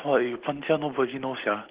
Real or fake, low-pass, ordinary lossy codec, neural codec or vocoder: real; 3.6 kHz; Opus, 16 kbps; none